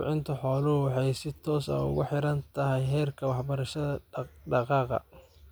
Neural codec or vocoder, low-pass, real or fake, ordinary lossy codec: none; none; real; none